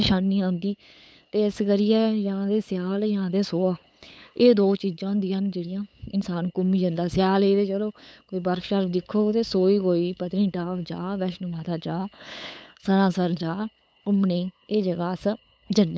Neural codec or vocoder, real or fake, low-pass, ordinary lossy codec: codec, 16 kHz, 8 kbps, FunCodec, trained on LibriTTS, 25 frames a second; fake; none; none